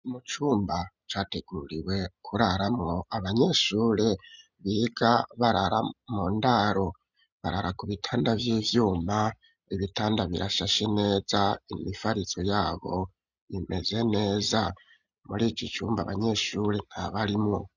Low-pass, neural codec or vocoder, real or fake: 7.2 kHz; none; real